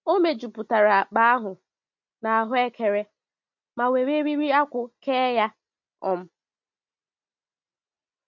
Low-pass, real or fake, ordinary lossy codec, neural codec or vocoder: 7.2 kHz; real; MP3, 48 kbps; none